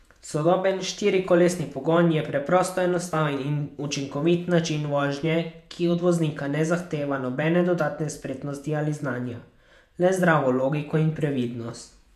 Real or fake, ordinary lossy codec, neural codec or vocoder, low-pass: fake; MP3, 96 kbps; vocoder, 48 kHz, 128 mel bands, Vocos; 14.4 kHz